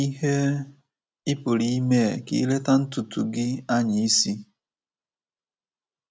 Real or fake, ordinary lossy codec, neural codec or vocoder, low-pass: real; none; none; none